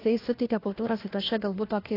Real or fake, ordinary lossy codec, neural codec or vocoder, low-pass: fake; AAC, 24 kbps; codec, 16 kHz, 1 kbps, FunCodec, trained on LibriTTS, 50 frames a second; 5.4 kHz